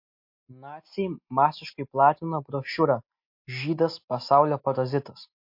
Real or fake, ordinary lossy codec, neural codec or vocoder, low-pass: real; MP3, 32 kbps; none; 5.4 kHz